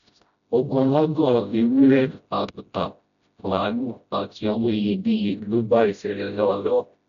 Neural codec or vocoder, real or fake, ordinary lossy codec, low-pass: codec, 16 kHz, 0.5 kbps, FreqCodec, smaller model; fake; none; 7.2 kHz